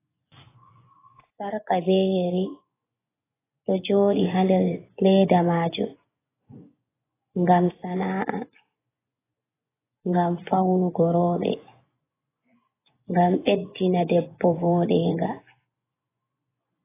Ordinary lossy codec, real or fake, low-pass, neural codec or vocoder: AAC, 16 kbps; fake; 3.6 kHz; vocoder, 24 kHz, 100 mel bands, Vocos